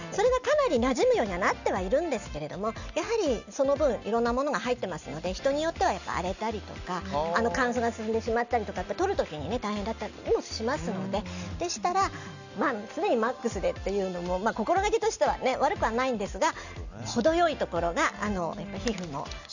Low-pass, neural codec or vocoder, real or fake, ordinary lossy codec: 7.2 kHz; none; real; none